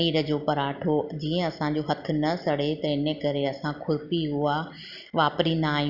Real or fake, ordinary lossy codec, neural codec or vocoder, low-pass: real; Opus, 64 kbps; none; 5.4 kHz